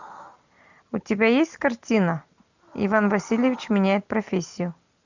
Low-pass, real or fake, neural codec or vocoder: 7.2 kHz; real; none